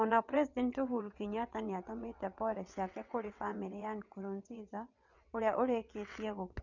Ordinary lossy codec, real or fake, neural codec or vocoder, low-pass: none; fake; vocoder, 22.05 kHz, 80 mel bands, WaveNeXt; 7.2 kHz